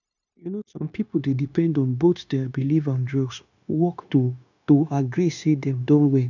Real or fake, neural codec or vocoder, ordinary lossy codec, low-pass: fake; codec, 16 kHz, 0.9 kbps, LongCat-Audio-Codec; none; 7.2 kHz